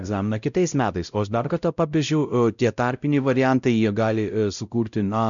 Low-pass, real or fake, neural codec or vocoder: 7.2 kHz; fake; codec, 16 kHz, 0.5 kbps, X-Codec, WavLM features, trained on Multilingual LibriSpeech